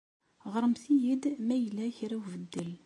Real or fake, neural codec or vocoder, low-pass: real; none; 10.8 kHz